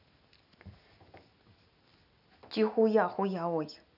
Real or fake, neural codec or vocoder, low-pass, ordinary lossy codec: real; none; 5.4 kHz; none